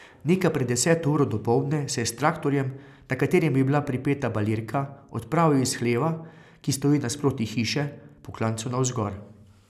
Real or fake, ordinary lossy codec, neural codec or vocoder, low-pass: real; none; none; 14.4 kHz